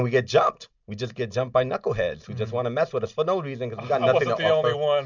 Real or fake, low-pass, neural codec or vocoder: real; 7.2 kHz; none